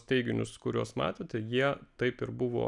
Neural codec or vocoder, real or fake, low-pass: none; real; 10.8 kHz